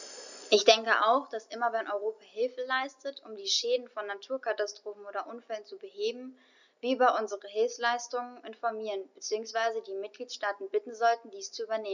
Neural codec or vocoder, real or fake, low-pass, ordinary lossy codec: none; real; 7.2 kHz; none